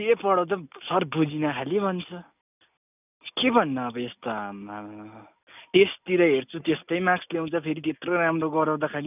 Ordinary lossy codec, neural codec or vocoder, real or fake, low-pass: none; none; real; 3.6 kHz